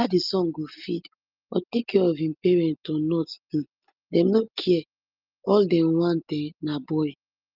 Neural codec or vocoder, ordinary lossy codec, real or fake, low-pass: none; Opus, 32 kbps; real; 5.4 kHz